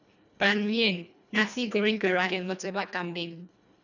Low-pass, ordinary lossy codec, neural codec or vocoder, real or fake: 7.2 kHz; none; codec, 24 kHz, 1.5 kbps, HILCodec; fake